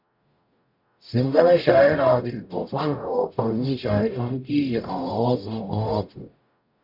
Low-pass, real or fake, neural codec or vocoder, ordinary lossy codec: 5.4 kHz; fake; codec, 44.1 kHz, 0.9 kbps, DAC; MP3, 48 kbps